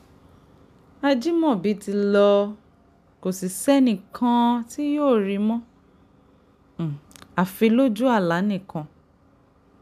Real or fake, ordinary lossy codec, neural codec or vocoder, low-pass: real; none; none; 14.4 kHz